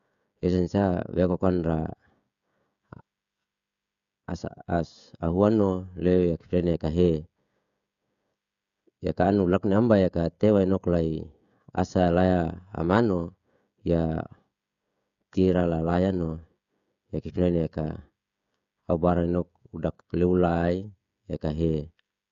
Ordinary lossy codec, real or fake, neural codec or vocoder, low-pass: none; fake; codec, 16 kHz, 16 kbps, FreqCodec, smaller model; 7.2 kHz